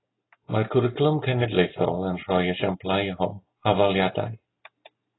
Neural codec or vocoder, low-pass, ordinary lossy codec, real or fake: none; 7.2 kHz; AAC, 16 kbps; real